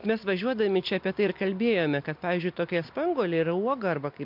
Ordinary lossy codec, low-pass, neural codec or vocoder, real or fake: MP3, 48 kbps; 5.4 kHz; none; real